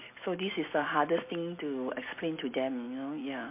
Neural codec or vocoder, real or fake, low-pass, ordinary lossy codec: none; real; 3.6 kHz; MP3, 24 kbps